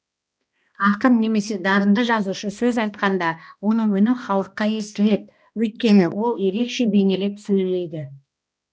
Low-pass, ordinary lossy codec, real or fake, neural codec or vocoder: none; none; fake; codec, 16 kHz, 1 kbps, X-Codec, HuBERT features, trained on balanced general audio